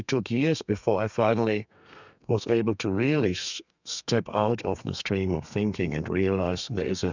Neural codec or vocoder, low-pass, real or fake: codec, 32 kHz, 1.9 kbps, SNAC; 7.2 kHz; fake